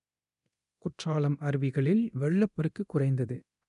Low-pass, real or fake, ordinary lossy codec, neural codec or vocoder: 10.8 kHz; fake; none; codec, 24 kHz, 0.9 kbps, DualCodec